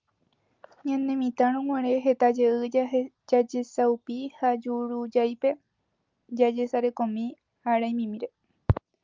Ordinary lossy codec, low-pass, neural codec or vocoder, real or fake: Opus, 24 kbps; 7.2 kHz; none; real